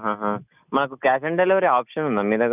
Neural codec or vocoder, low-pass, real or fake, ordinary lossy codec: none; 3.6 kHz; real; none